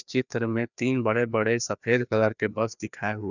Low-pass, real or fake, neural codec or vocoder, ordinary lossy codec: 7.2 kHz; fake; codec, 16 kHz, 2 kbps, FreqCodec, larger model; none